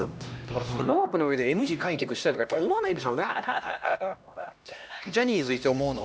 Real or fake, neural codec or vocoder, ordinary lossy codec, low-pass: fake; codec, 16 kHz, 1 kbps, X-Codec, HuBERT features, trained on LibriSpeech; none; none